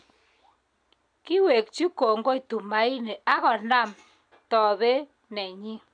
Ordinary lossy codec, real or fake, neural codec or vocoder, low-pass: none; real; none; 9.9 kHz